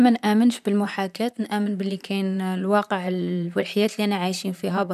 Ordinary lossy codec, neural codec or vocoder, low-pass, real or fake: none; vocoder, 44.1 kHz, 128 mel bands, Pupu-Vocoder; 14.4 kHz; fake